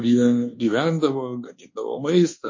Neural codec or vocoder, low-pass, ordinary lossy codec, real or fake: codec, 24 kHz, 1.2 kbps, DualCodec; 7.2 kHz; MP3, 32 kbps; fake